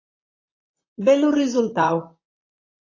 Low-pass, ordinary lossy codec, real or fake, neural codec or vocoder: 7.2 kHz; AAC, 32 kbps; fake; vocoder, 44.1 kHz, 128 mel bands, Pupu-Vocoder